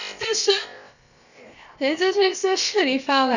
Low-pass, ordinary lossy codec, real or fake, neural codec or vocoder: 7.2 kHz; none; fake; codec, 16 kHz, about 1 kbps, DyCAST, with the encoder's durations